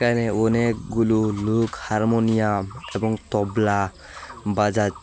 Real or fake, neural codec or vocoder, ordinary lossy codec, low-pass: real; none; none; none